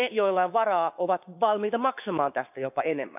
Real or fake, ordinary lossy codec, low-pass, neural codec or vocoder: fake; none; 3.6 kHz; codec, 16 kHz, 6 kbps, DAC